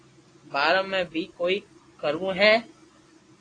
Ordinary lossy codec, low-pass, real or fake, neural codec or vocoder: AAC, 32 kbps; 9.9 kHz; real; none